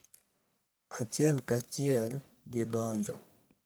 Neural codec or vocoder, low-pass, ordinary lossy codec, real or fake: codec, 44.1 kHz, 1.7 kbps, Pupu-Codec; none; none; fake